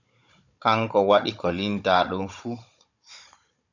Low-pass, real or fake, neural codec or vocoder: 7.2 kHz; fake; codec, 16 kHz, 16 kbps, FunCodec, trained on Chinese and English, 50 frames a second